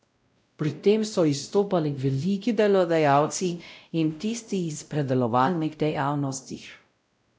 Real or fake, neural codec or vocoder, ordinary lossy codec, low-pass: fake; codec, 16 kHz, 0.5 kbps, X-Codec, WavLM features, trained on Multilingual LibriSpeech; none; none